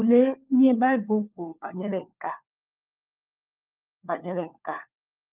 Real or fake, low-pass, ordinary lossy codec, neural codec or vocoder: fake; 3.6 kHz; Opus, 24 kbps; codec, 16 kHz, 4 kbps, FunCodec, trained on LibriTTS, 50 frames a second